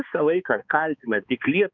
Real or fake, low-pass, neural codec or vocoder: fake; 7.2 kHz; codec, 16 kHz, 2 kbps, X-Codec, HuBERT features, trained on balanced general audio